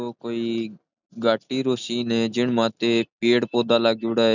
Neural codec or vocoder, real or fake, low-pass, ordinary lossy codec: none; real; 7.2 kHz; none